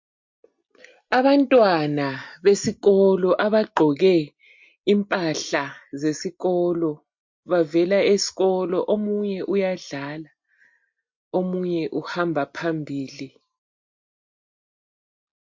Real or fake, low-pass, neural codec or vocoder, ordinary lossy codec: real; 7.2 kHz; none; MP3, 48 kbps